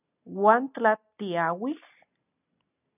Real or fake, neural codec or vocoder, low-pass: real; none; 3.6 kHz